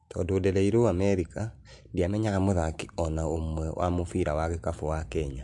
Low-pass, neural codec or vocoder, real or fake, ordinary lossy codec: 10.8 kHz; none; real; MP3, 64 kbps